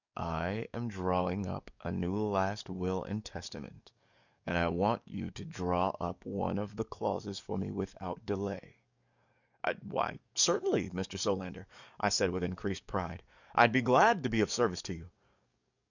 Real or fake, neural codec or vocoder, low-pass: fake; codec, 44.1 kHz, 7.8 kbps, DAC; 7.2 kHz